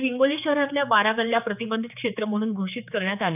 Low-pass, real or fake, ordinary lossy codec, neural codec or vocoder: 3.6 kHz; fake; none; codec, 16 kHz, 4 kbps, X-Codec, HuBERT features, trained on general audio